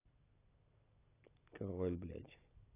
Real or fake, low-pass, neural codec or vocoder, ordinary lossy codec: real; 3.6 kHz; none; AAC, 16 kbps